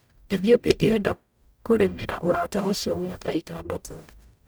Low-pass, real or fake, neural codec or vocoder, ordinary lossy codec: none; fake; codec, 44.1 kHz, 0.9 kbps, DAC; none